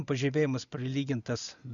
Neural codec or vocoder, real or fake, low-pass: none; real; 7.2 kHz